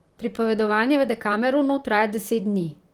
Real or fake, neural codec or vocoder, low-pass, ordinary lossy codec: fake; vocoder, 44.1 kHz, 128 mel bands, Pupu-Vocoder; 19.8 kHz; Opus, 24 kbps